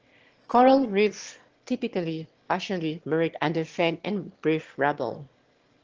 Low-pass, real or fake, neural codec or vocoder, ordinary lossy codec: 7.2 kHz; fake; autoencoder, 22.05 kHz, a latent of 192 numbers a frame, VITS, trained on one speaker; Opus, 16 kbps